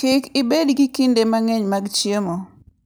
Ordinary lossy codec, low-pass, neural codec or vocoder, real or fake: none; none; none; real